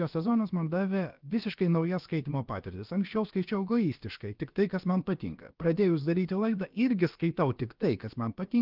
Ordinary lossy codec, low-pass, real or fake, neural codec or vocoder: Opus, 32 kbps; 5.4 kHz; fake; codec, 16 kHz, about 1 kbps, DyCAST, with the encoder's durations